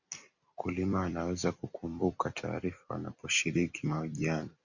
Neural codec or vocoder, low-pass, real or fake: vocoder, 44.1 kHz, 128 mel bands every 256 samples, BigVGAN v2; 7.2 kHz; fake